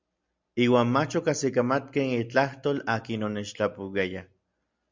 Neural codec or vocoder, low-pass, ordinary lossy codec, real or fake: none; 7.2 kHz; MP3, 64 kbps; real